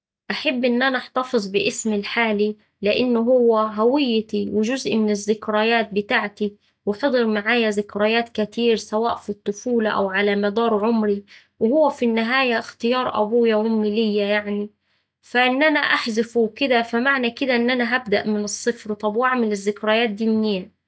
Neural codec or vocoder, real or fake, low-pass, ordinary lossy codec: none; real; none; none